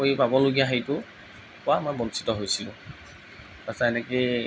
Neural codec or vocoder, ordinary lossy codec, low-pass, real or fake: none; none; none; real